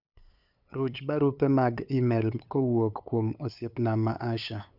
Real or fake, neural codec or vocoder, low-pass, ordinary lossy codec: fake; codec, 16 kHz, 8 kbps, FunCodec, trained on LibriTTS, 25 frames a second; 5.4 kHz; none